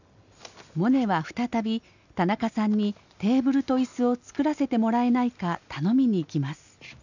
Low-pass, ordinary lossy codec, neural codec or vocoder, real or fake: 7.2 kHz; none; none; real